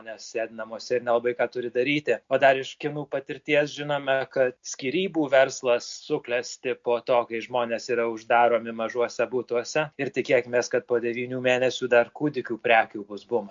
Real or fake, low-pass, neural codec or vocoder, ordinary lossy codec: real; 7.2 kHz; none; MP3, 48 kbps